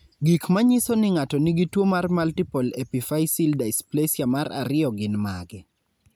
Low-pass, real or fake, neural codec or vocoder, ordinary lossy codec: none; real; none; none